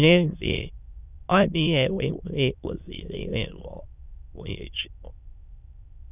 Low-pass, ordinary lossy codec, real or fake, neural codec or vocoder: 3.6 kHz; none; fake; autoencoder, 22.05 kHz, a latent of 192 numbers a frame, VITS, trained on many speakers